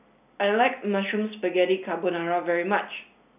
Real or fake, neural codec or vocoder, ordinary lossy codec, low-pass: real; none; none; 3.6 kHz